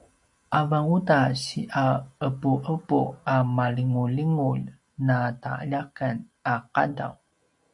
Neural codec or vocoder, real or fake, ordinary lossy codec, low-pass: none; real; MP3, 64 kbps; 10.8 kHz